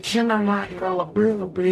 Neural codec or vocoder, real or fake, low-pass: codec, 44.1 kHz, 0.9 kbps, DAC; fake; 14.4 kHz